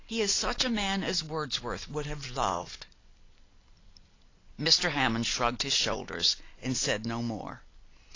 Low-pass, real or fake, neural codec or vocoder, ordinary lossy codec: 7.2 kHz; real; none; AAC, 32 kbps